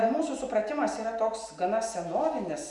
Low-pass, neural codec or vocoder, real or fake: 10.8 kHz; none; real